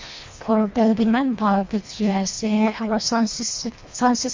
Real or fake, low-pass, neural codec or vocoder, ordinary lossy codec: fake; 7.2 kHz; codec, 24 kHz, 1.5 kbps, HILCodec; MP3, 48 kbps